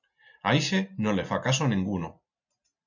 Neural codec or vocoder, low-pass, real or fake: none; 7.2 kHz; real